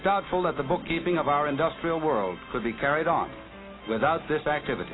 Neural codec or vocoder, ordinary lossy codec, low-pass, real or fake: none; AAC, 16 kbps; 7.2 kHz; real